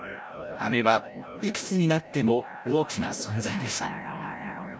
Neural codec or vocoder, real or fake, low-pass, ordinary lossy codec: codec, 16 kHz, 0.5 kbps, FreqCodec, larger model; fake; none; none